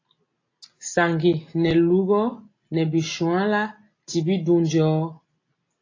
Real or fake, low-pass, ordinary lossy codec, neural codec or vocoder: real; 7.2 kHz; AAC, 32 kbps; none